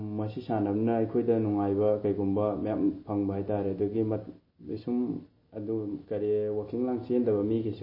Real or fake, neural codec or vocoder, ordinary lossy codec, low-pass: real; none; MP3, 24 kbps; 5.4 kHz